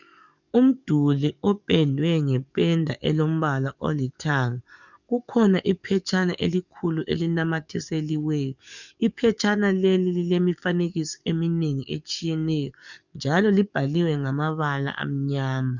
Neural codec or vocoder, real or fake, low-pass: codec, 44.1 kHz, 7.8 kbps, DAC; fake; 7.2 kHz